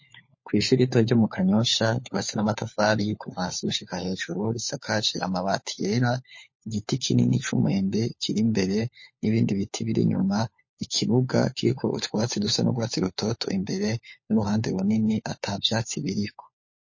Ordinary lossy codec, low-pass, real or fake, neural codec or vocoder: MP3, 32 kbps; 7.2 kHz; fake; codec, 16 kHz, 4 kbps, FunCodec, trained on LibriTTS, 50 frames a second